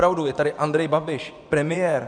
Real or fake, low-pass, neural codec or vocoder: fake; 9.9 kHz; vocoder, 24 kHz, 100 mel bands, Vocos